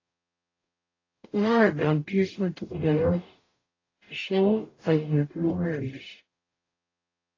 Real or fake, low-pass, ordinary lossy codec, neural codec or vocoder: fake; 7.2 kHz; AAC, 32 kbps; codec, 44.1 kHz, 0.9 kbps, DAC